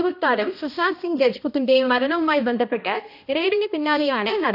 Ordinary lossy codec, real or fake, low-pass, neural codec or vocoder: none; fake; 5.4 kHz; codec, 16 kHz, 1 kbps, X-Codec, HuBERT features, trained on balanced general audio